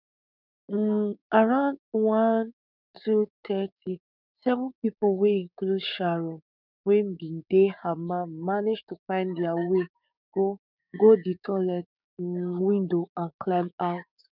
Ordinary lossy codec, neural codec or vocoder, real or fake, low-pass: none; codec, 16 kHz, 6 kbps, DAC; fake; 5.4 kHz